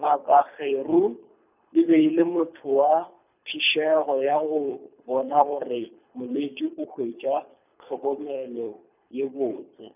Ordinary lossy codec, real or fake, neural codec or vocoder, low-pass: none; fake; codec, 24 kHz, 3 kbps, HILCodec; 3.6 kHz